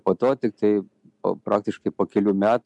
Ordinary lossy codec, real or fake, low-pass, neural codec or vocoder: MP3, 96 kbps; real; 10.8 kHz; none